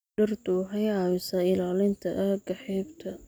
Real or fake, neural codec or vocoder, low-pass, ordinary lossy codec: real; none; none; none